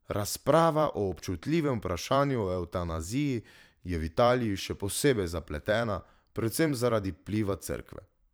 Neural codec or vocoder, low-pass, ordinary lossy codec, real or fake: vocoder, 44.1 kHz, 128 mel bands every 512 samples, BigVGAN v2; none; none; fake